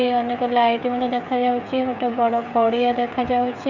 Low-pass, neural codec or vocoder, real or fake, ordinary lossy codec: 7.2 kHz; codec, 16 kHz, 16 kbps, FreqCodec, smaller model; fake; none